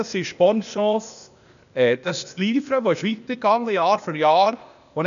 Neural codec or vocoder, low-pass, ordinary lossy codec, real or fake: codec, 16 kHz, 0.8 kbps, ZipCodec; 7.2 kHz; none; fake